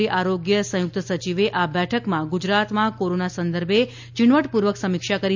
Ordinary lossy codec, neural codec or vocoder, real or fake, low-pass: MP3, 64 kbps; none; real; 7.2 kHz